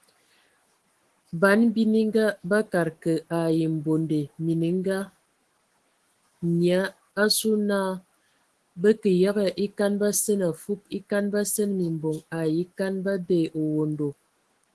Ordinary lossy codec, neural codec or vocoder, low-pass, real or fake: Opus, 16 kbps; autoencoder, 48 kHz, 128 numbers a frame, DAC-VAE, trained on Japanese speech; 10.8 kHz; fake